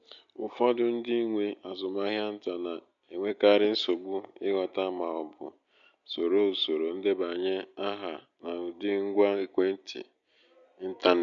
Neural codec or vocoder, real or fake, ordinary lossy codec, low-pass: none; real; MP3, 48 kbps; 7.2 kHz